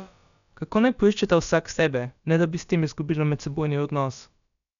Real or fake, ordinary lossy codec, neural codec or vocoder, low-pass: fake; none; codec, 16 kHz, about 1 kbps, DyCAST, with the encoder's durations; 7.2 kHz